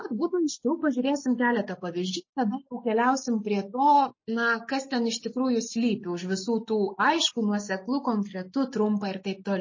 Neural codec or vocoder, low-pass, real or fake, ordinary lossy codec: none; 7.2 kHz; real; MP3, 32 kbps